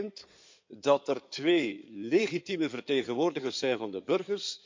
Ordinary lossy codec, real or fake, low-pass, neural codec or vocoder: MP3, 64 kbps; fake; 7.2 kHz; codec, 16 kHz in and 24 kHz out, 2.2 kbps, FireRedTTS-2 codec